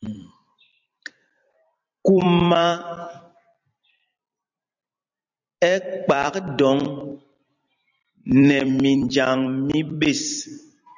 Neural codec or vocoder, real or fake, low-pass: vocoder, 44.1 kHz, 128 mel bands every 256 samples, BigVGAN v2; fake; 7.2 kHz